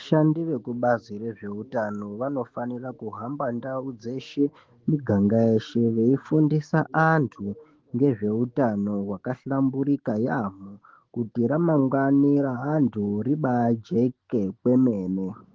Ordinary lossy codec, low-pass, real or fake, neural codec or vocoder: Opus, 16 kbps; 7.2 kHz; fake; autoencoder, 48 kHz, 128 numbers a frame, DAC-VAE, trained on Japanese speech